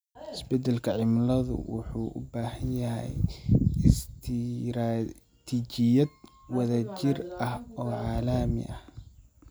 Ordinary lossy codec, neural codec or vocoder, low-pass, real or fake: none; none; none; real